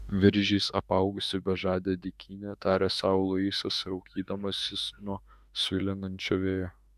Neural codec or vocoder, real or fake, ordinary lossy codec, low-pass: autoencoder, 48 kHz, 32 numbers a frame, DAC-VAE, trained on Japanese speech; fake; Opus, 64 kbps; 14.4 kHz